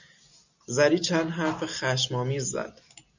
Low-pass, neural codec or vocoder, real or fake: 7.2 kHz; none; real